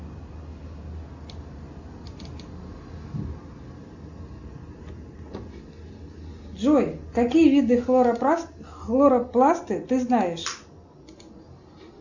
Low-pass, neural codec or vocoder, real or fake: 7.2 kHz; none; real